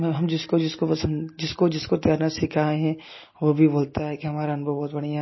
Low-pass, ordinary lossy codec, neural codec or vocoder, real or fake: 7.2 kHz; MP3, 24 kbps; none; real